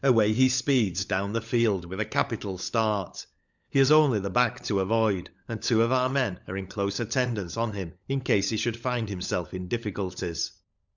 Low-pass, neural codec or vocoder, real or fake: 7.2 kHz; codec, 16 kHz, 16 kbps, FunCodec, trained on LibriTTS, 50 frames a second; fake